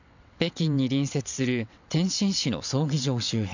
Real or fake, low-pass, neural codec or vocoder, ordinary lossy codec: fake; 7.2 kHz; vocoder, 22.05 kHz, 80 mel bands, WaveNeXt; none